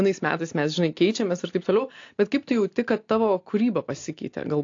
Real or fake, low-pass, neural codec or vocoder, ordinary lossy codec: real; 7.2 kHz; none; AAC, 48 kbps